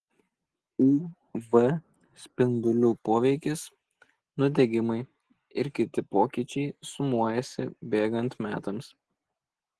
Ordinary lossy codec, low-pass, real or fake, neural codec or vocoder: Opus, 16 kbps; 10.8 kHz; real; none